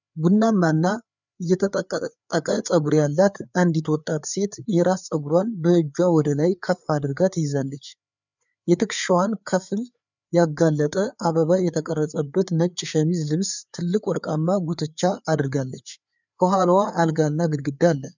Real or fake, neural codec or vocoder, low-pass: fake; codec, 16 kHz, 4 kbps, FreqCodec, larger model; 7.2 kHz